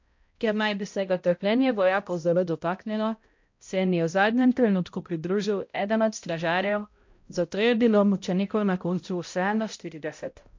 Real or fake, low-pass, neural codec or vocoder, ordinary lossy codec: fake; 7.2 kHz; codec, 16 kHz, 0.5 kbps, X-Codec, HuBERT features, trained on balanced general audio; MP3, 48 kbps